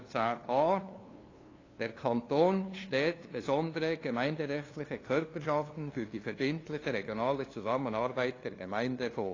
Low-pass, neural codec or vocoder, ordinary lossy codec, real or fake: 7.2 kHz; codec, 16 kHz, 2 kbps, FunCodec, trained on LibriTTS, 25 frames a second; AAC, 32 kbps; fake